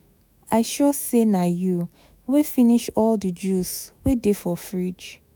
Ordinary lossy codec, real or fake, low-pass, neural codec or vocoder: none; fake; none; autoencoder, 48 kHz, 128 numbers a frame, DAC-VAE, trained on Japanese speech